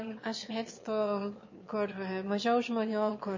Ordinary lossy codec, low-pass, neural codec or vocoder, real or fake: MP3, 32 kbps; 7.2 kHz; autoencoder, 22.05 kHz, a latent of 192 numbers a frame, VITS, trained on one speaker; fake